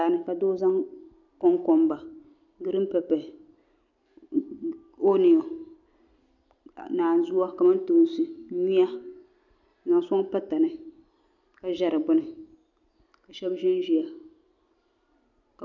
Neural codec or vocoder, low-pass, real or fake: none; 7.2 kHz; real